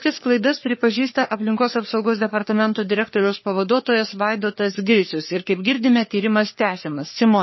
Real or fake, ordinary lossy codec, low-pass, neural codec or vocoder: fake; MP3, 24 kbps; 7.2 kHz; codec, 44.1 kHz, 3.4 kbps, Pupu-Codec